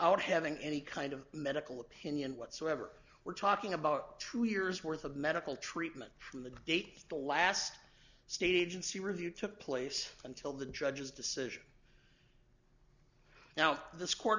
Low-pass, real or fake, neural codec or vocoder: 7.2 kHz; real; none